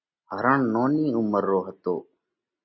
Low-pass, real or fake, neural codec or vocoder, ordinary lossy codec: 7.2 kHz; real; none; MP3, 24 kbps